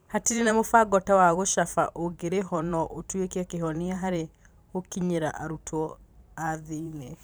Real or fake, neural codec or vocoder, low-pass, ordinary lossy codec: fake; vocoder, 44.1 kHz, 128 mel bands every 512 samples, BigVGAN v2; none; none